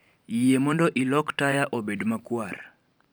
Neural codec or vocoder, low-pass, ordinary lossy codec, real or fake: vocoder, 44.1 kHz, 128 mel bands every 512 samples, BigVGAN v2; none; none; fake